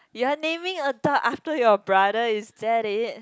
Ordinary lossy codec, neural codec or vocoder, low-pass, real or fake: none; none; none; real